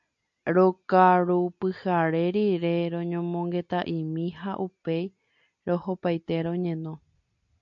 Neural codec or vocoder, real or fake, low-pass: none; real; 7.2 kHz